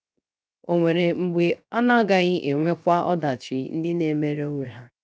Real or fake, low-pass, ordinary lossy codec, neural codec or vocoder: fake; none; none; codec, 16 kHz, 0.7 kbps, FocalCodec